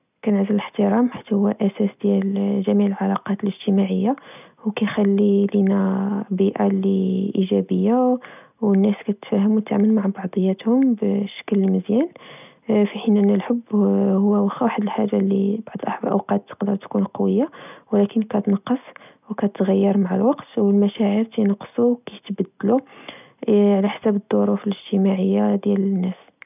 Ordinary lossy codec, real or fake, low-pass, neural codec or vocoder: none; real; 3.6 kHz; none